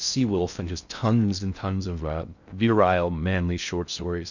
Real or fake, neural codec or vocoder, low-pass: fake; codec, 16 kHz in and 24 kHz out, 0.6 kbps, FocalCodec, streaming, 4096 codes; 7.2 kHz